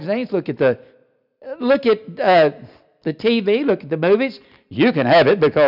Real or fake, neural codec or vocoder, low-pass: real; none; 5.4 kHz